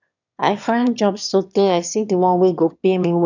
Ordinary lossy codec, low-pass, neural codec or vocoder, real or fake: none; 7.2 kHz; autoencoder, 22.05 kHz, a latent of 192 numbers a frame, VITS, trained on one speaker; fake